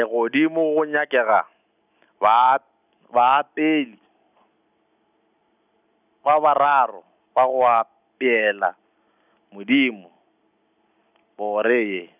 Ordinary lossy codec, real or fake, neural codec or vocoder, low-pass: none; real; none; 3.6 kHz